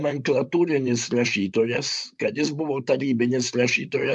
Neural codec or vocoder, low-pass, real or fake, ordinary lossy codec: vocoder, 44.1 kHz, 128 mel bands, Pupu-Vocoder; 10.8 kHz; fake; MP3, 96 kbps